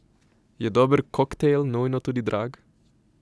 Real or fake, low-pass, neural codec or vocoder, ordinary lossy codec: real; none; none; none